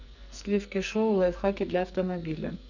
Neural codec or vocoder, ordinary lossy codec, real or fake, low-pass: codec, 44.1 kHz, 2.6 kbps, SNAC; none; fake; 7.2 kHz